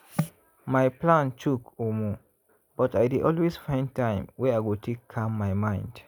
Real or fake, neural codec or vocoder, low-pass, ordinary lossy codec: real; none; none; none